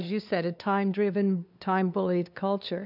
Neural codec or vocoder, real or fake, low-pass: codec, 16 kHz, 2 kbps, FunCodec, trained on LibriTTS, 25 frames a second; fake; 5.4 kHz